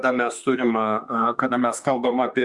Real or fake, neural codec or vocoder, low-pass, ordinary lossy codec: fake; autoencoder, 48 kHz, 32 numbers a frame, DAC-VAE, trained on Japanese speech; 10.8 kHz; Opus, 64 kbps